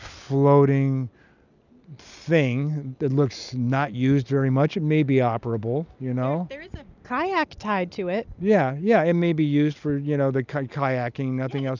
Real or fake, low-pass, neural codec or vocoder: real; 7.2 kHz; none